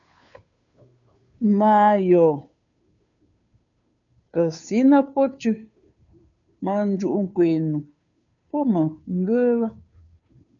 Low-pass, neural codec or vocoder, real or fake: 7.2 kHz; codec, 16 kHz, 2 kbps, FunCodec, trained on Chinese and English, 25 frames a second; fake